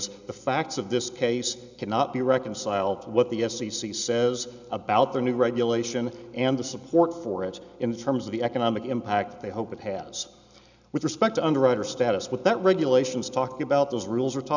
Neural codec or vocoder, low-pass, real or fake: none; 7.2 kHz; real